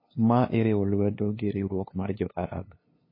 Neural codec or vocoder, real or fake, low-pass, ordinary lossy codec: codec, 16 kHz, 2 kbps, FunCodec, trained on LibriTTS, 25 frames a second; fake; 5.4 kHz; MP3, 24 kbps